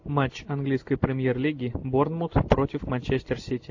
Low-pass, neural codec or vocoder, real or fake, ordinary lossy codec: 7.2 kHz; none; real; AAC, 48 kbps